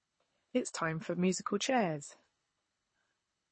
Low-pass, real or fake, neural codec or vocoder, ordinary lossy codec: 9.9 kHz; fake; codec, 24 kHz, 6 kbps, HILCodec; MP3, 32 kbps